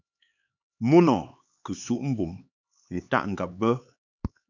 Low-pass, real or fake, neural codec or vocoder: 7.2 kHz; fake; codec, 16 kHz, 4 kbps, X-Codec, HuBERT features, trained on LibriSpeech